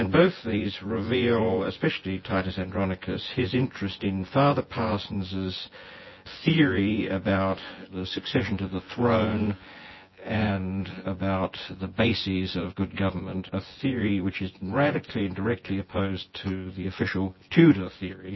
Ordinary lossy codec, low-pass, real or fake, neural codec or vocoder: MP3, 24 kbps; 7.2 kHz; fake; vocoder, 24 kHz, 100 mel bands, Vocos